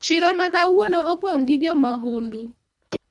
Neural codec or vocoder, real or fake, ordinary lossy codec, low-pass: codec, 24 kHz, 1.5 kbps, HILCodec; fake; none; 10.8 kHz